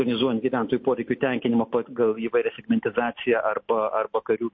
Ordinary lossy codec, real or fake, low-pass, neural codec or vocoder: MP3, 32 kbps; real; 7.2 kHz; none